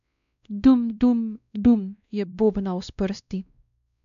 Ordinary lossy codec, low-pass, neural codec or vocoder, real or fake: none; 7.2 kHz; codec, 16 kHz, 1 kbps, X-Codec, WavLM features, trained on Multilingual LibriSpeech; fake